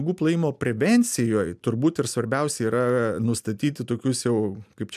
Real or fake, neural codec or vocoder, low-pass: real; none; 14.4 kHz